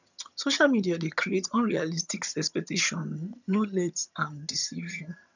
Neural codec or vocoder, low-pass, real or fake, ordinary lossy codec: vocoder, 22.05 kHz, 80 mel bands, HiFi-GAN; 7.2 kHz; fake; none